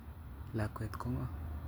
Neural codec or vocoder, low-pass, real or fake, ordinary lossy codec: none; none; real; none